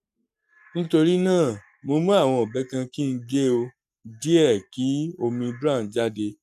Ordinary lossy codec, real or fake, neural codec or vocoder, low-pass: none; fake; codec, 44.1 kHz, 7.8 kbps, Pupu-Codec; 14.4 kHz